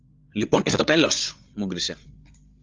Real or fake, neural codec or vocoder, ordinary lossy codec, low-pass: fake; codec, 16 kHz, 8 kbps, FreqCodec, larger model; Opus, 32 kbps; 7.2 kHz